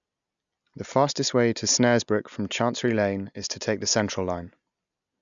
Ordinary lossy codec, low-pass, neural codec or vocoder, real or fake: MP3, 96 kbps; 7.2 kHz; none; real